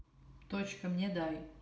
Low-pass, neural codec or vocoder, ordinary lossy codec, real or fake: none; none; none; real